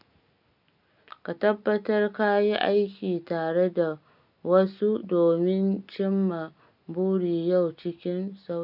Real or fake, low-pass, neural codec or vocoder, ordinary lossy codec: real; 5.4 kHz; none; none